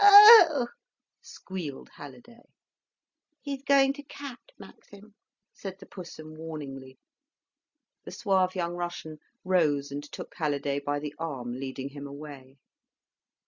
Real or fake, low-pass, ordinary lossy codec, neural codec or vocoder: real; 7.2 kHz; Opus, 64 kbps; none